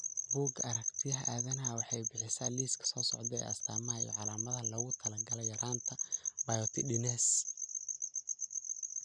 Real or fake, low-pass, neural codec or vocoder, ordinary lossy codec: real; 9.9 kHz; none; none